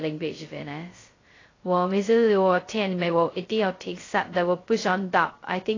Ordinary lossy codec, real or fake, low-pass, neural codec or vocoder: AAC, 32 kbps; fake; 7.2 kHz; codec, 16 kHz, 0.2 kbps, FocalCodec